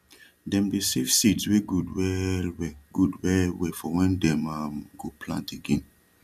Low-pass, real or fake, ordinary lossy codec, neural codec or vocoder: 14.4 kHz; real; none; none